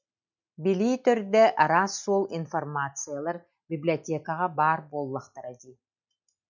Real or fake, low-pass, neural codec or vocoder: real; 7.2 kHz; none